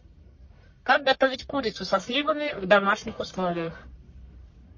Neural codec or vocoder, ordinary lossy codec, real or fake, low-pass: codec, 44.1 kHz, 1.7 kbps, Pupu-Codec; MP3, 32 kbps; fake; 7.2 kHz